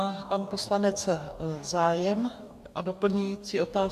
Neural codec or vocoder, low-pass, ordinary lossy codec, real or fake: codec, 44.1 kHz, 2.6 kbps, DAC; 14.4 kHz; AAC, 96 kbps; fake